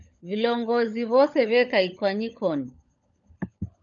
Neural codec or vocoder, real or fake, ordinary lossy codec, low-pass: codec, 16 kHz, 16 kbps, FunCodec, trained on LibriTTS, 50 frames a second; fake; MP3, 96 kbps; 7.2 kHz